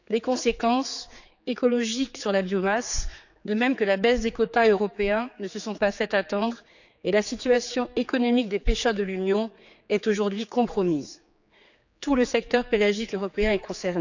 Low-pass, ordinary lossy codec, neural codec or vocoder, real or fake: 7.2 kHz; none; codec, 16 kHz, 4 kbps, X-Codec, HuBERT features, trained on general audio; fake